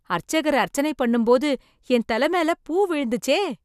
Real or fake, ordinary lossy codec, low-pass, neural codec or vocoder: real; none; 14.4 kHz; none